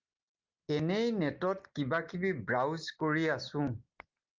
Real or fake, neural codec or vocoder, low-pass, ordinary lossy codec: real; none; 7.2 kHz; Opus, 32 kbps